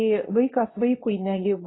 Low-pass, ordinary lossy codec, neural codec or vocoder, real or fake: 7.2 kHz; AAC, 16 kbps; codec, 24 kHz, 0.9 kbps, WavTokenizer, medium speech release version 2; fake